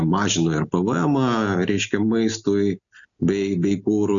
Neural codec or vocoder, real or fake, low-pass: none; real; 7.2 kHz